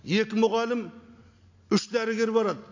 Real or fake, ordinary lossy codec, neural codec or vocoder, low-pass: real; AAC, 48 kbps; none; 7.2 kHz